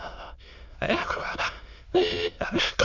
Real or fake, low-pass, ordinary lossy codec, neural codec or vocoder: fake; 7.2 kHz; none; autoencoder, 22.05 kHz, a latent of 192 numbers a frame, VITS, trained on many speakers